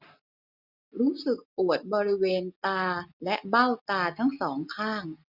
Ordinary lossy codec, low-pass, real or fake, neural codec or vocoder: none; 5.4 kHz; real; none